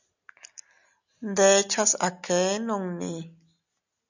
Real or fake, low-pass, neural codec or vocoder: real; 7.2 kHz; none